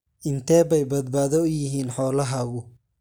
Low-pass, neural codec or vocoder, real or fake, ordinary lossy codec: none; none; real; none